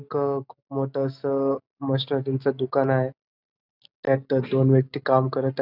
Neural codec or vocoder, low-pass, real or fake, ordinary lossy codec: none; 5.4 kHz; real; none